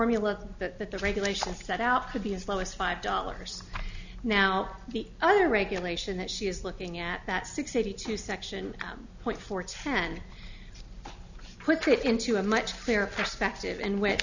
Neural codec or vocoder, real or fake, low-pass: none; real; 7.2 kHz